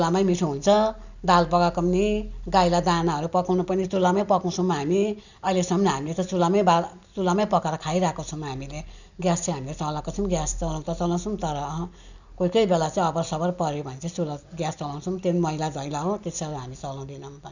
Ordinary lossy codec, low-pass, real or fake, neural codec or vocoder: none; 7.2 kHz; real; none